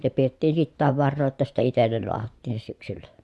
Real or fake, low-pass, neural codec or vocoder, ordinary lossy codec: fake; none; vocoder, 24 kHz, 100 mel bands, Vocos; none